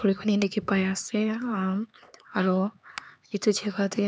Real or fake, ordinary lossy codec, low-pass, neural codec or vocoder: fake; none; none; codec, 16 kHz, 4 kbps, X-Codec, HuBERT features, trained on LibriSpeech